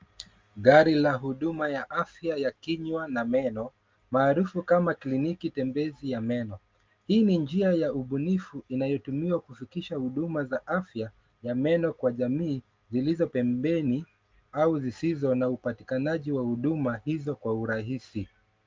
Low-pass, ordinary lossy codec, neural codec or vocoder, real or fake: 7.2 kHz; Opus, 32 kbps; none; real